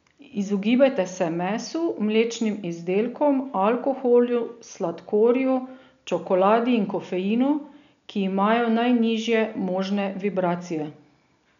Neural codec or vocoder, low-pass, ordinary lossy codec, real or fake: none; 7.2 kHz; none; real